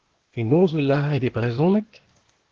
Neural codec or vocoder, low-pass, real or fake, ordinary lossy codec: codec, 16 kHz, 0.8 kbps, ZipCodec; 7.2 kHz; fake; Opus, 16 kbps